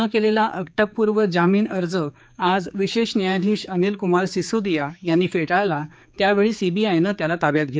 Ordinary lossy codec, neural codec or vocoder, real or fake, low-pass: none; codec, 16 kHz, 4 kbps, X-Codec, HuBERT features, trained on general audio; fake; none